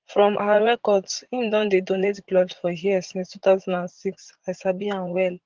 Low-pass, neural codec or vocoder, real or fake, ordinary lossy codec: 7.2 kHz; vocoder, 44.1 kHz, 80 mel bands, Vocos; fake; Opus, 16 kbps